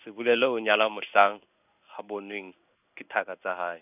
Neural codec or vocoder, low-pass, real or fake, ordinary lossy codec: codec, 16 kHz in and 24 kHz out, 1 kbps, XY-Tokenizer; 3.6 kHz; fake; none